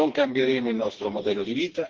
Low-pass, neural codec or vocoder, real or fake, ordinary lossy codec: 7.2 kHz; codec, 16 kHz, 2 kbps, FreqCodec, smaller model; fake; Opus, 16 kbps